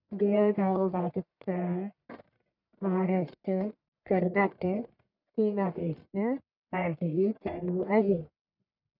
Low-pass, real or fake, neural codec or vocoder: 5.4 kHz; fake; codec, 44.1 kHz, 1.7 kbps, Pupu-Codec